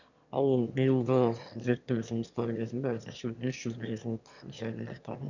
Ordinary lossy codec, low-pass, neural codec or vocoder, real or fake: none; 7.2 kHz; autoencoder, 22.05 kHz, a latent of 192 numbers a frame, VITS, trained on one speaker; fake